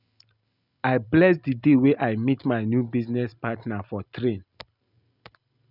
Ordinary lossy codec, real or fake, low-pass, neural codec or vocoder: none; real; 5.4 kHz; none